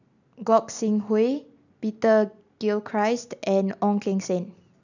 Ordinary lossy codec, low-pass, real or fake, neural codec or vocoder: none; 7.2 kHz; real; none